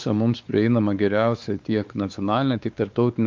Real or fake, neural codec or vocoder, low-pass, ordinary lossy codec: fake; codec, 16 kHz, 4 kbps, X-Codec, WavLM features, trained on Multilingual LibriSpeech; 7.2 kHz; Opus, 24 kbps